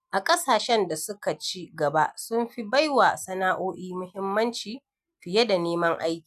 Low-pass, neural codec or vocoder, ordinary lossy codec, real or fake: 14.4 kHz; none; none; real